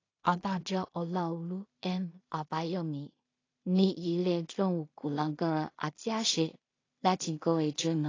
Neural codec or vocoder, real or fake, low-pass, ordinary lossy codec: codec, 16 kHz in and 24 kHz out, 0.4 kbps, LongCat-Audio-Codec, two codebook decoder; fake; 7.2 kHz; AAC, 32 kbps